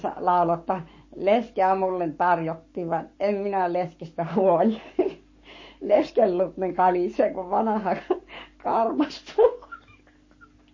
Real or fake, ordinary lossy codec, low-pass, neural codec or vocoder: fake; MP3, 32 kbps; 7.2 kHz; codec, 44.1 kHz, 7.8 kbps, Pupu-Codec